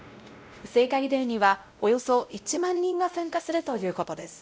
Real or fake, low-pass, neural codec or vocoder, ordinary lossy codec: fake; none; codec, 16 kHz, 0.5 kbps, X-Codec, WavLM features, trained on Multilingual LibriSpeech; none